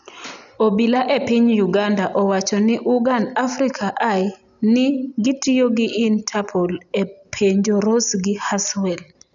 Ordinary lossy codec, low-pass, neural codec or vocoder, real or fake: none; 7.2 kHz; none; real